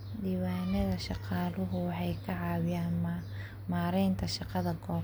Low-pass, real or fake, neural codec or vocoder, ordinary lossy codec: none; real; none; none